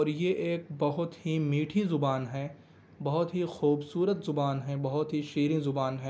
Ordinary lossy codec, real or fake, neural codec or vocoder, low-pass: none; real; none; none